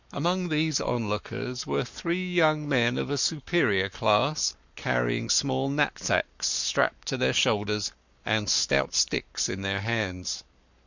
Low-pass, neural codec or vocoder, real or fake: 7.2 kHz; codec, 44.1 kHz, 7.8 kbps, Pupu-Codec; fake